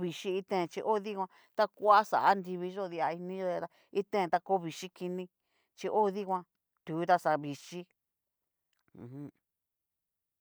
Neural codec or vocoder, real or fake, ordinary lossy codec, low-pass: none; real; none; none